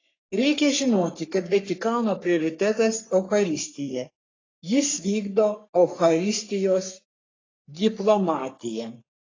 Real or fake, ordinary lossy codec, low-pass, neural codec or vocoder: fake; AAC, 32 kbps; 7.2 kHz; codec, 44.1 kHz, 3.4 kbps, Pupu-Codec